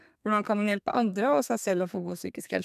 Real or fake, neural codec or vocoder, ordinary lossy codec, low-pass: fake; codec, 32 kHz, 1.9 kbps, SNAC; none; 14.4 kHz